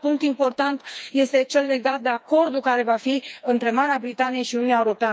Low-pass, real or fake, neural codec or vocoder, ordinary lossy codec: none; fake; codec, 16 kHz, 2 kbps, FreqCodec, smaller model; none